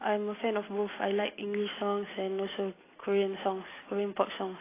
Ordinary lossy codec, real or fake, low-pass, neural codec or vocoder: AAC, 16 kbps; real; 3.6 kHz; none